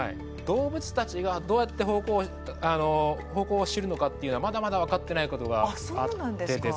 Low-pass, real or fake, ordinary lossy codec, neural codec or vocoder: none; real; none; none